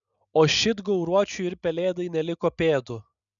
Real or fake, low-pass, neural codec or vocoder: real; 7.2 kHz; none